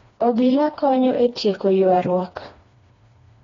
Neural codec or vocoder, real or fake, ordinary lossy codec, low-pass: codec, 16 kHz, 2 kbps, FreqCodec, smaller model; fake; AAC, 32 kbps; 7.2 kHz